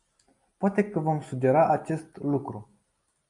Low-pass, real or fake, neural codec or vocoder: 10.8 kHz; real; none